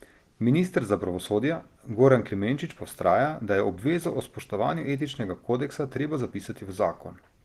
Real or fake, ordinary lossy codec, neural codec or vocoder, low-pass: real; Opus, 16 kbps; none; 14.4 kHz